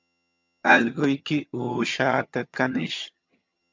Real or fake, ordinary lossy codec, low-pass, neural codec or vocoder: fake; MP3, 64 kbps; 7.2 kHz; vocoder, 22.05 kHz, 80 mel bands, HiFi-GAN